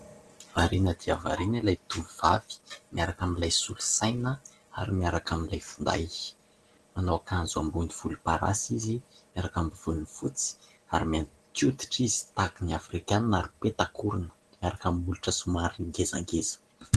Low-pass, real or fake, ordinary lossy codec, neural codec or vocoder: 10.8 kHz; real; Opus, 16 kbps; none